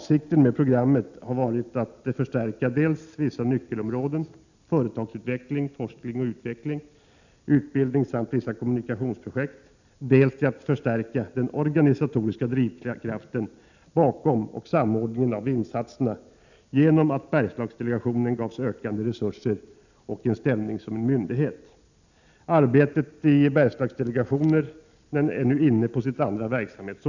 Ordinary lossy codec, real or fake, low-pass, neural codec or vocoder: none; real; 7.2 kHz; none